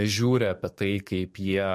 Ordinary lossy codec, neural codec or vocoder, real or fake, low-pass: MP3, 64 kbps; codec, 44.1 kHz, 7.8 kbps, DAC; fake; 14.4 kHz